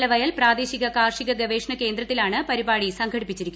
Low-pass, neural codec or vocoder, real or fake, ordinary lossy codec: none; none; real; none